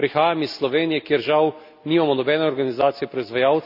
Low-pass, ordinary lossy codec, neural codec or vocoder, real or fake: 5.4 kHz; none; none; real